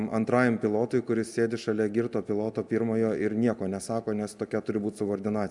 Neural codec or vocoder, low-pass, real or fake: none; 10.8 kHz; real